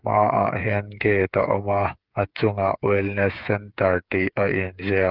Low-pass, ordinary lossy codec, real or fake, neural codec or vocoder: 5.4 kHz; Opus, 32 kbps; fake; codec, 16 kHz, 8 kbps, FreqCodec, smaller model